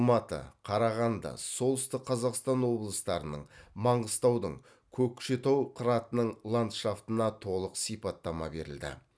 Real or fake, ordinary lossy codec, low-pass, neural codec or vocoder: real; none; none; none